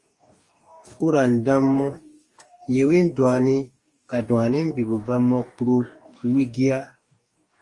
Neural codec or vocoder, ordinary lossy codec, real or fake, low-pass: codec, 44.1 kHz, 2.6 kbps, DAC; Opus, 32 kbps; fake; 10.8 kHz